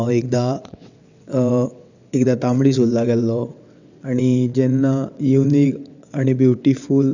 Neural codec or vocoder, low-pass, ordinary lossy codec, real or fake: vocoder, 22.05 kHz, 80 mel bands, WaveNeXt; 7.2 kHz; none; fake